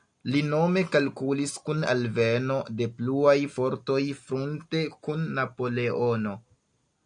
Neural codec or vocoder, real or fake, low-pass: none; real; 9.9 kHz